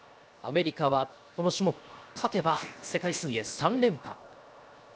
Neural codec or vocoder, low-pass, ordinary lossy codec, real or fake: codec, 16 kHz, 0.7 kbps, FocalCodec; none; none; fake